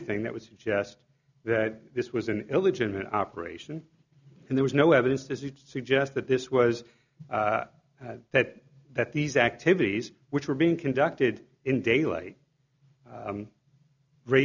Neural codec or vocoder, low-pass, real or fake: none; 7.2 kHz; real